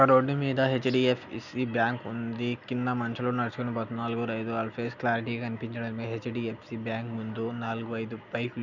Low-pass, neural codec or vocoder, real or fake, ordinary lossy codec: 7.2 kHz; none; real; none